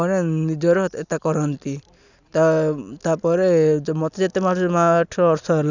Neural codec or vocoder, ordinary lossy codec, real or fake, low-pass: none; none; real; 7.2 kHz